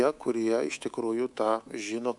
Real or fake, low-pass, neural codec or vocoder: fake; 10.8 kHz; codec, 24 kHz, 3.1 kbps, DualCodec